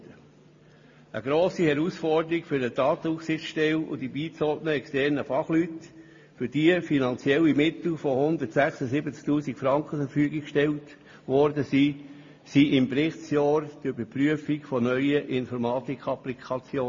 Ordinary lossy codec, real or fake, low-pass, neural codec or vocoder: MP3, 32 kbps; real; 7.2 kHz; none